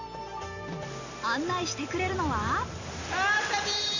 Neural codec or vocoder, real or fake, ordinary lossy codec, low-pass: none; real; Opus, 64 kbps; 7.2 kHz